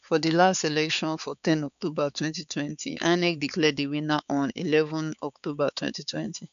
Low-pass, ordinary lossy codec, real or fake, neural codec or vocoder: 7.2 kHz; none; fake; codec, 16 kHz, 4 kbps, X-Codec, HuBERT features, trained on balanced general audio